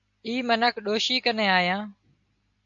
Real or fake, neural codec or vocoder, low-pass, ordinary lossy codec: real; none; 7.2 kHz; AAC, 64 kbps